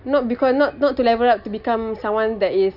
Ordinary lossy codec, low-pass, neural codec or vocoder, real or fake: none; 5.4 kHz; none; real